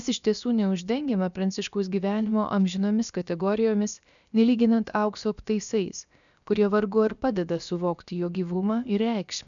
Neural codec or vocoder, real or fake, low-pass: codec, 16 kHz, about 1 kbps, DyCAST, with the encoder's durations; fake; 7.2 kHz